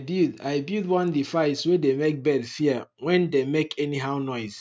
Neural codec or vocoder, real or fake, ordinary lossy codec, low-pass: none; real; none; none